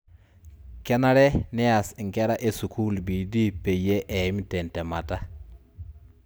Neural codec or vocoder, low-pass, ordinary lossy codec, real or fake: none; none; none; real